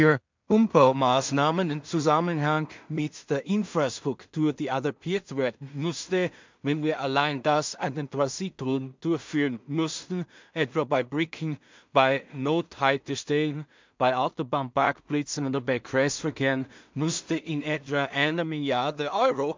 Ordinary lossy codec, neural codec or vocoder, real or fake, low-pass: MP3, 64 kbps; codec, 16 kHz in and 24 kHz out, 0.4 kbps, LongCat-Audio-Codec, two codebook decoder; fake; 7.2 kHz